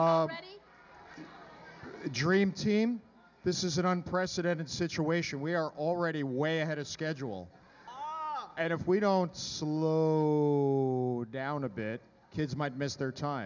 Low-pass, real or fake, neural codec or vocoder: 7.2 kHz; real; none